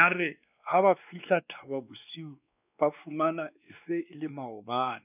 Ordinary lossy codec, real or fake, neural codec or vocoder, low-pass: none; fake; codec, 16 kHz, 2 kbps, X-Codec, WavLM features, trained on Multilingual LibriSpeech; 3.6 kHz